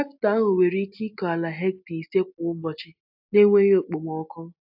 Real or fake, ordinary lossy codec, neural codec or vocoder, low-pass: real; AAC, 48 kbps; none; 5.4 kHz